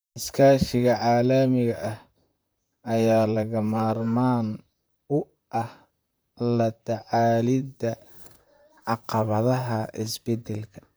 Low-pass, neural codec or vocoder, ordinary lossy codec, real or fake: none; vocoder, 44.1 kHz, 128 mel bands, Pupu-Vocoder; none; fake